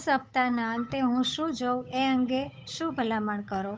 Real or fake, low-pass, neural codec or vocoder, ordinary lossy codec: fake; none; codec, 16 kHz, 8 kbps, FunCodec, trained on Chinese and English, 25 frames a second; none